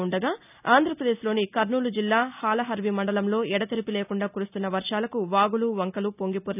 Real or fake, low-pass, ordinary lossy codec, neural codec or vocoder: real; 3.6 kHz; none; none